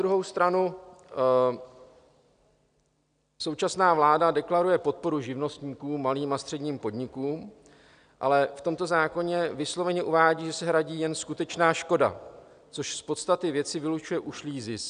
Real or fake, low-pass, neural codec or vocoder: real; 9.9 kHz; none